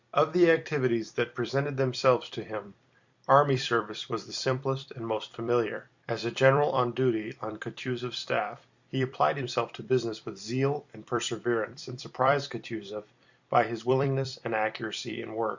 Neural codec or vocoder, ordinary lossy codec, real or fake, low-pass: vocoder, 44.1 kHz, 128 mel bands every 256 samples, BigVGAN v2; Opus, 64 kbps; fake; 7.2 kHz